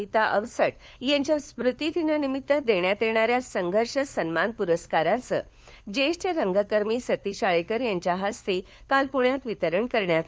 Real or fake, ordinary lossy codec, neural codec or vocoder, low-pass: fake; none; codec, 16 kHz, 4 kbps, FunCodec, trained on LibriTTS, 50 frames a second; none